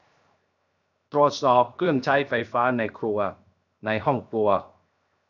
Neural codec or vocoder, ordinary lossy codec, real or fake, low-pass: codec, 16 kHz, 0.7 kbps, FocalCodec; none; fake; 7.2 kHz